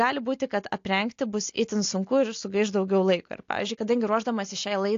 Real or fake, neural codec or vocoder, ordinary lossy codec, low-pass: real; none; AAC, 48 kbps; 7.2 kHz